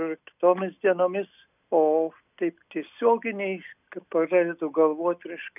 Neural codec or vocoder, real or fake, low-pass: none; real; 3.6 kHz